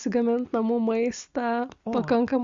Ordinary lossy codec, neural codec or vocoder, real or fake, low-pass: Opus, 64 kbps; none; real; 7.2 kHz